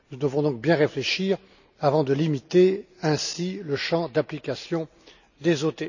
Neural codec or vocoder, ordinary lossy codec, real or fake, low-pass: none; none; real; 7.2 kHz